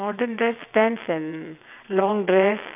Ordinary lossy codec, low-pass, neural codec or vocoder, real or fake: none; 3.6 kHz; vocoder, 22.05 kHz, 80 mel bands, WaveNeXt; fake